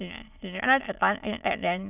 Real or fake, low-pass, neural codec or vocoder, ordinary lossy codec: fake; 3.6 kHz; autoencoder, 22.05 kHz, a latent of 192 numbers a frame, VITS, trained on many speakers; none